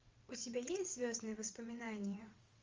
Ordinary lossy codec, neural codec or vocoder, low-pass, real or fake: Opus, 32 kbps; vocoder, 22.05 kHz, 80 mel bands, WaveNeXt; 7.2 kHz; fake